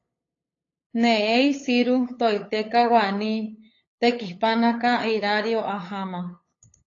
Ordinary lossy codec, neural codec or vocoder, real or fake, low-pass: AAC, 32 kbps; codec, 16 kHz, 8 kbps, FunCodec, trained on LibriTTS, 25 frames a second; fake; 7.2 kHz